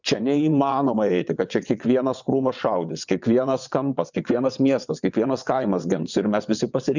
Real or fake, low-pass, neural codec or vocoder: fake; 7.2 kHz; vocoder, 22.05 kHz, 80 mel bands, WaveNeXt